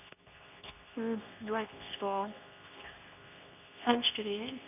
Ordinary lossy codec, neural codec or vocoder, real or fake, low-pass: none; codec, 24 kHz, 0.9 kbps, WavTokenizer, medium speech release version 2; fake; 3.6 kHz